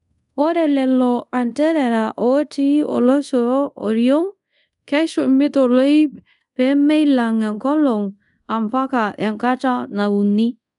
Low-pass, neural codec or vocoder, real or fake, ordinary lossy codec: 10.8 kHz; codec, 24 kHz, 0.5 kbps, DualCodec; fake; none